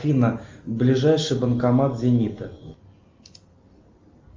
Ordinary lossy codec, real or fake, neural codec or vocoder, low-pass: Opus, 32 kbps; real; none; 7.2 kHz